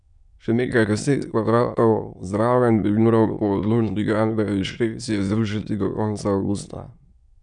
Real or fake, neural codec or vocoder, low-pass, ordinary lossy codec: fake; autoencoder, 22.05 kHz, a latent of 192 numbers a frame, VITS, trained on many speakers; 9.9 kHz; none